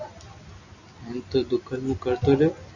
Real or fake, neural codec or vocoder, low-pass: real; none; 7.2 kHz